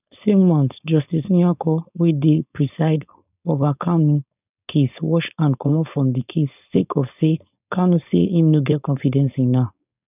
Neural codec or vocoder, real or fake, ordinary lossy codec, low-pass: codec, 16 kHz, 4.8 kbps, FACodec; fake; none; 3.6 kHz